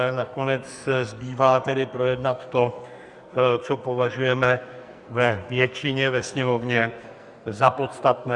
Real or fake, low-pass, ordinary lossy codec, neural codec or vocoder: fake; 10.8 kHz; Opus, 64 kbps; codec, 44.1 kHz, 2.6 kbps, SNAC